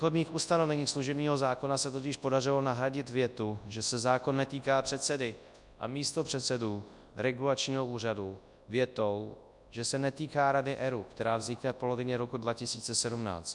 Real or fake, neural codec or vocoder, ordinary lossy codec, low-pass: fake; codec, 24 kHz, 0.9 kbps, WavTokenizer, large speech release; MP3, 96 kbps; 10.8 kHz